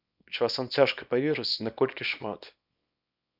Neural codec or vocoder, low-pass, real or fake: codec, 24 kHz, 0.9 kbps, WavTokenizer, small release; 5.4 kHz; fake